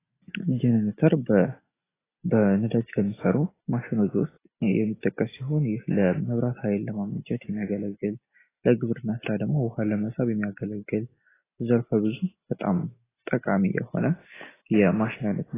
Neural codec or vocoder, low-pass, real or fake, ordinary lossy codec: none; 3.6 kHz; real; AAC, 16 kbps